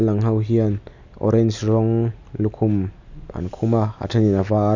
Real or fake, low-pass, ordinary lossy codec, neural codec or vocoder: real; 7.2 kHz; none; none